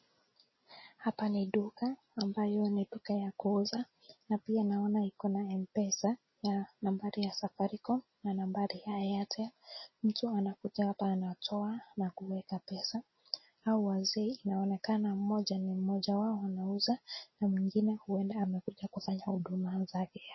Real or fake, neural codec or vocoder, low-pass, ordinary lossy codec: real; none; 7.2 kHz; MP3, 24 kbps